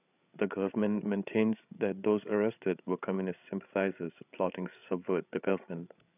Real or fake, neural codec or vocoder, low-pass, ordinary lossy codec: fake; codec, 16 kHz, 8 kbps, FreqCodec, larger model; 3.6 kHz; none